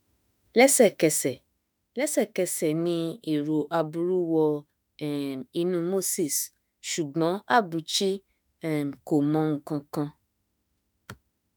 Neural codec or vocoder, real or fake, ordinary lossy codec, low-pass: autoencoder, 48 kHz, 32 numbers a frame, DAC-VAE, trained on Japanese speech; fake; none; none